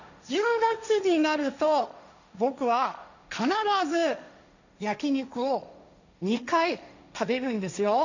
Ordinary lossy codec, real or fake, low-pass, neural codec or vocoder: none; fake; 7.2 kHz; codec, 16 kHz, 1.1 kbps, Voila-Tokenizer